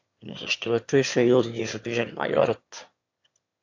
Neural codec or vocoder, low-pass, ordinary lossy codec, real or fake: autoencoder, 22.05 kHz, a latent of 192 numbers a frame, VITS, trained on one speaker; 7.2 kHz; AAC, 48 kbps; fake